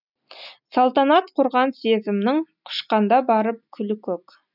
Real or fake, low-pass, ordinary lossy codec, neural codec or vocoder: real; 5.4 kHz; none; none